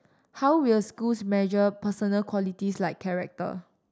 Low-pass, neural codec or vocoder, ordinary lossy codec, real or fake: none; none; none; real